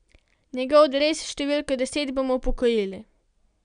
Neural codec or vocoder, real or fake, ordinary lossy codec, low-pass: none; real; none; 9.9 kHz